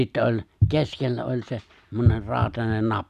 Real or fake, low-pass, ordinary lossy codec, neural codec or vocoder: real; 14.4 kHz; none; none